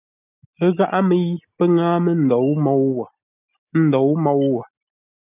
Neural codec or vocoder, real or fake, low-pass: none; real; 3.6 kHz